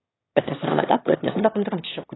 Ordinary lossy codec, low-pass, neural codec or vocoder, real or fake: AAC, 16 kbps; 7.2 kHz; autoencoder, 22.05 kHz, a latent of 192 numbers a frame, VITS, trained on one speaker; fake